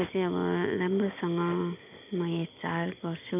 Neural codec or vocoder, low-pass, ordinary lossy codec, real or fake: none; 3.6 kHz; none; real